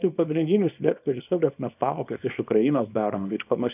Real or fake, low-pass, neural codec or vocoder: fake; 3.6 kHz; codec, 24 kHz, 0.9 kbps, WavTokenizer, small release